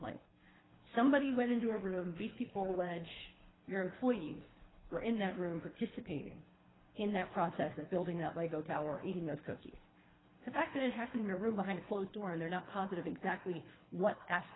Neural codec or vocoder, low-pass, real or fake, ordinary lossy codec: codec, 24 kHz, 3 kbps, HILCodec; 7.2 kHz; fake; AAC, 16 kbps